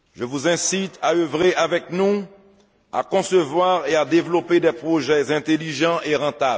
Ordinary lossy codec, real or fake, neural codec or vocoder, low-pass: none; real; none; none